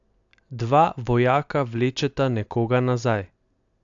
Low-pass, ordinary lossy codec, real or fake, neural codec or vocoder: 7.2 kHz; AAC, 64 kbps; real; none